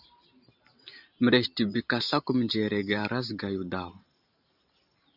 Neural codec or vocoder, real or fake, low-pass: vocoder, 44.1 kHz, 128 mel bands every 512 samples, BigVGAN v2; fake; 5.4 kHz